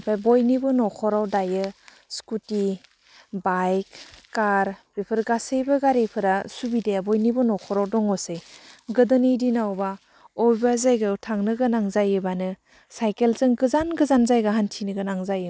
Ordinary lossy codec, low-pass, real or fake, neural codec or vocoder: none; none; real; none